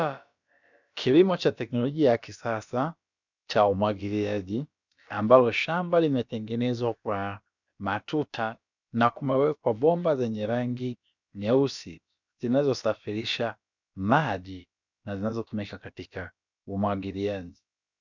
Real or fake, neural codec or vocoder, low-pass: fake; codec, 16 kHz, about 1 kbps, DyCAST, with the encoder's durations; 7.2 kHz